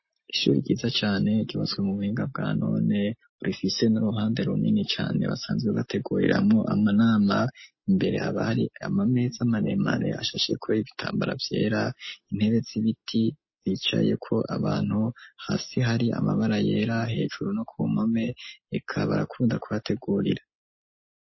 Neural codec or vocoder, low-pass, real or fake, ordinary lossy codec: none; 7.2 kHz; real; MP3, 24 kbps